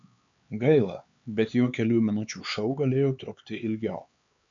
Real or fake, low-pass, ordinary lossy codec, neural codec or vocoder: fake; 7.2 kHz; MP3, 64 kbps; codec, 16 kHz, 4 kbps, X-Codec, HuBERT features, trained on LibriSpeech